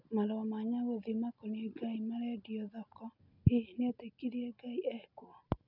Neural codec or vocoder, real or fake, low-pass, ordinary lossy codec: none; real; 5.4 kHz; none